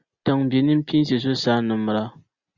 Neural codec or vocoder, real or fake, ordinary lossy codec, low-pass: none; real; Opus, 64 kbps; 7.2 kHz